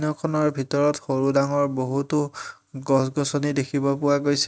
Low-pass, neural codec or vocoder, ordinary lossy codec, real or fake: none; none; none; real